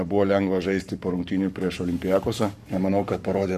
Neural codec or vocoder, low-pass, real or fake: codec, 44.1 kHz, 7.8 kbps, Pupu-Codec; 14.4 kHz; fake